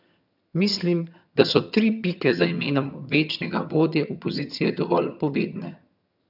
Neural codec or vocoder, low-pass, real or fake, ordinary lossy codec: vocoder, 22.05 kHz, 80 mel bands, HiFi-GAN; 5.4 kHz; fake; none